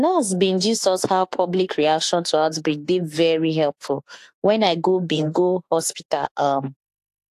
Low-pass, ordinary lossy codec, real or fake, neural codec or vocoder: 14.4 kHz; AAC, 64 kbps; fake; autoencoder, 48 kHz, 32 numbers a frame, DAC-VAE, trained on Japanese speech